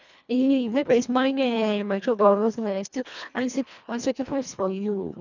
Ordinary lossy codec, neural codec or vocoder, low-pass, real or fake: none; codec, 24 kHz, 1.5 kbps, HILCodec; 7.2 kHz; fake